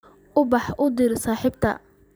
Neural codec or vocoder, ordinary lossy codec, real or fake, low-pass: vocoder, 44.1 kHz, 128 mel bands every 512 samples, BigVGAN v2; none; fake; none